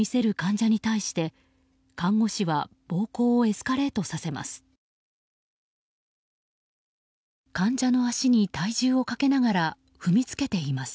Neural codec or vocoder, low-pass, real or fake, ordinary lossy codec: none; none; real; none